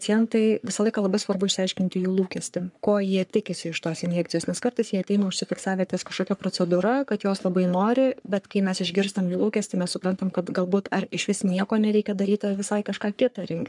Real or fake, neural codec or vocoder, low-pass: fake; codec, 44.1 kHz, 3.4 kbps, Pupu-Codec; 10.8 kHz